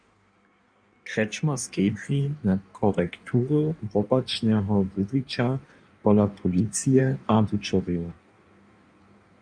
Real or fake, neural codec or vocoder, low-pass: fake; codec, 16 kHz in and 24 kHz out, 1.1 kbps, FireRedTTS-2 codec; 9.9 kHz